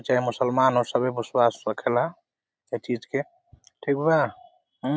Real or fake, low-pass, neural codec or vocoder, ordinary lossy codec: real; none; none; none